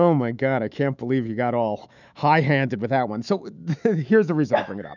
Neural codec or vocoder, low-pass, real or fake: autoencoder, 48 kHz, 128 numbers a frame, DAC-VAE, trained on Japanese speech; 7.2 kHz; fake